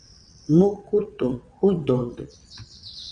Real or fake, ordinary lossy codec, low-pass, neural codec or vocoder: fake; Opus, 64 kbps; 9.9 kHz; vocoder, 22.05 kHz, 80 mel bands, WaveNeXt